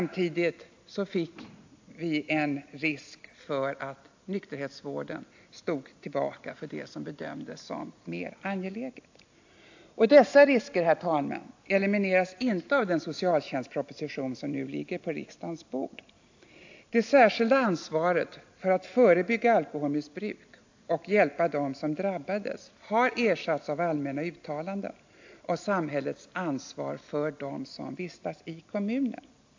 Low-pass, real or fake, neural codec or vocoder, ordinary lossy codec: 7.2 kHz; real; none; AAC, 48 kbps